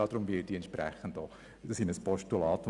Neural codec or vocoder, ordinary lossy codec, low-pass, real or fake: none; none; 10.8 kHz; real